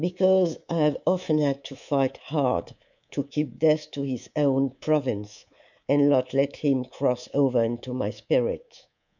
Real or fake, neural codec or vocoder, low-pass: fake; codec, 24 kHz, 3.1 kbps, DualCodec; 7.2 kHz